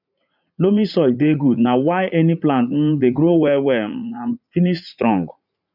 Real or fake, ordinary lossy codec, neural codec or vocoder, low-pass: fake; none; vocoder, 44.1 kHz, 80 mel bands, Vocos; 5.4 kHz